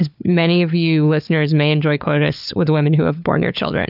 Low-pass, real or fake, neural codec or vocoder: 5.4 kHz; fake; codec, 16 kHz, 4 kbps, FunCodec, trained on LibriTTS, 50 frames a second